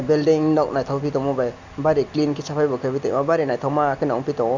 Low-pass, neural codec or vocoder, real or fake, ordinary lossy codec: 7.2 kHz; none; real; Opus, 64 kbps